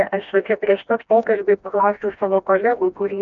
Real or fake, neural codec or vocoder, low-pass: fake; codec, 16 kHz, 1 kbps, FreqCodec, smaller model; 7.2 kHz